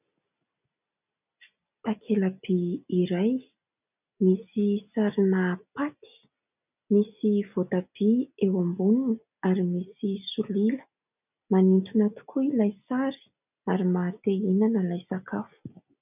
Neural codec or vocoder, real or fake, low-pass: none; real; 3.6 kHz